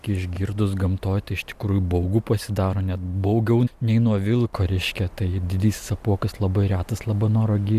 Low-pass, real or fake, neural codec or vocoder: 14.4 kHz; real; none